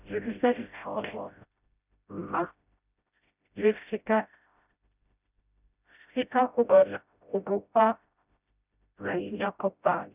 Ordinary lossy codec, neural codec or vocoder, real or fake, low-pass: none; codec, 16 kHz, 0.5 kbps, FreqCodec, smaller model; fake; 3.6 kHz